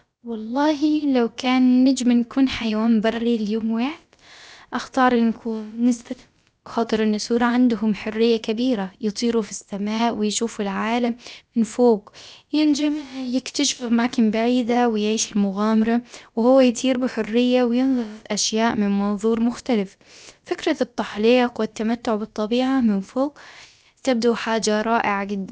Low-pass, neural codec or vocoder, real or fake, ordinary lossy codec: none; codec, 16 kHz, about 1 kbps, DyCAST, with the encoder's durations; fake; none